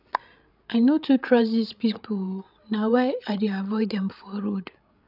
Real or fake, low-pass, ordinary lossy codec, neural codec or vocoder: fake; 5.4 kHz; none; codec, 16 kHz, 8 kbps, FreqCodec, larger model